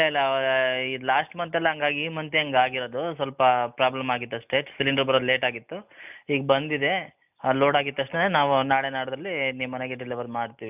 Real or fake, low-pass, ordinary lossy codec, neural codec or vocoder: real; 3.6 kHz; none; none